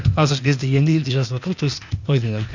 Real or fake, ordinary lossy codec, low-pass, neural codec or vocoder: fake; none; 7.2 kHz; codec, 16 kHz, 0.8 kbps, ZipCodec